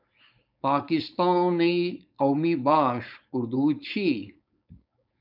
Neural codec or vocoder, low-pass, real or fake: codec, 16 kHz, 4.8 kbps, FACodec; 5.4 kHz; fake